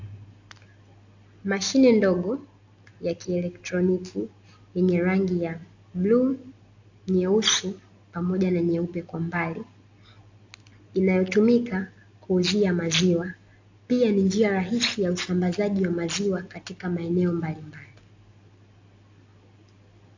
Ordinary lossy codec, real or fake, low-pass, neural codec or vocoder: AAC, 48 kbps; real; 7.2 kHz; none